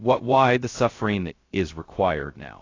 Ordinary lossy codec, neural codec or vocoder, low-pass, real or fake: AAC, 32 kbps; codec, 16 kHz, 0.2 kbps, FocalCodec; 7.2 kHz; fake